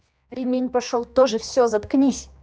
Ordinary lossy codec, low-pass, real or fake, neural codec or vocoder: none; none; fake; codec, 16 kHz, 2 kbps, X-Codec, HuBERT features, trained on general audio